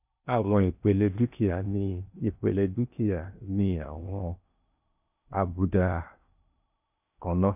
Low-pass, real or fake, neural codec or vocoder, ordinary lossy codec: 3.6 kHz; fake; codec, 16 kHz in and 24 kHz out, 0.8 kbps, FocalCodec, streaming, 65536 codes; none